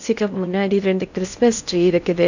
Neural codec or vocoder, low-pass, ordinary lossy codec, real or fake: codec, 16 kHz in and 24 kHz out, 0.6 kbps, FocalCodec, streaming, 4096 codes; 7.2 kHz; none; fake